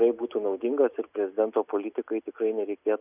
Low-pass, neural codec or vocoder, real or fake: 3.6 kHz; none; real